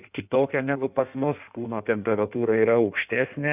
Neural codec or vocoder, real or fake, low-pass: codec, 16 kHz in and 24 kHz out, 1.1 kbps, FireRedTTS-2 codec; fake; 3.6 kHz